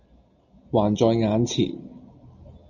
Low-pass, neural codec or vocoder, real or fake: 7.2 kHz; none; real